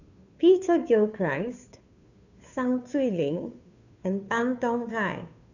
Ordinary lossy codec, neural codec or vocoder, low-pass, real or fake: none; codec, 16 kHz, 2 kbps, FunCodec, trained on Chinese and English, 25 frames a second; 7.2 kHz; fake